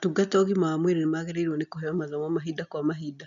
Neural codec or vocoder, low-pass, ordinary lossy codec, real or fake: none; 7.2 kHz; none; real